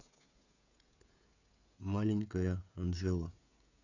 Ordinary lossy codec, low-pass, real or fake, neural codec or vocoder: none; 7.2 kHz; fake; codec, 16 kHz, 16 kbps, FreqCodec, smaller model